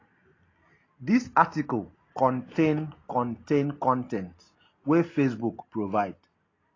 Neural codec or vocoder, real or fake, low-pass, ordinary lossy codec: none; real; 7.2 kHz; AAC, 32 kbps